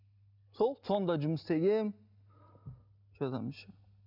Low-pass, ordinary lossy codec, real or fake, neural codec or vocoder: 5.4 kHz; none; real; none